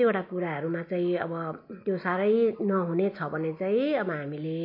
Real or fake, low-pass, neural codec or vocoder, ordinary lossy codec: real; 5.4 kHz; none; MP3, 32 kbps